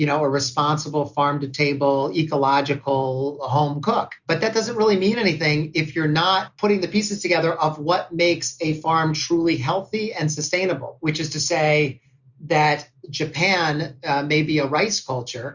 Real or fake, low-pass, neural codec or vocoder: real; 7.2 kHz; none